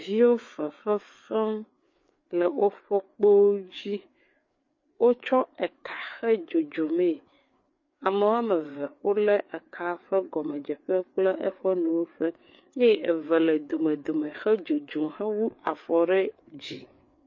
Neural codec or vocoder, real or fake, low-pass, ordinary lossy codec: codec, 16 kHz, 4 kbps, FreqCodec, larger model; fake; 7.2 kHz; MP3, 32 kbps